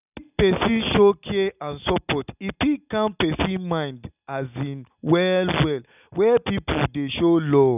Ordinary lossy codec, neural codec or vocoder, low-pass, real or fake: none; none; 3.6 kHz; real